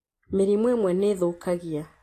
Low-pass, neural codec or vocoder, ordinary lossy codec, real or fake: 14.4 kHz; none; AAC, 48 kbps; real